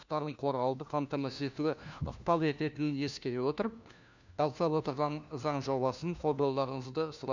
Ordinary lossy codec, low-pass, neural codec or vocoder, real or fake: none; 7.2 kHz; codec, 16 kHz, 1 kbps, FunCodec, trained on LibriTTS, 50 frames a second; fake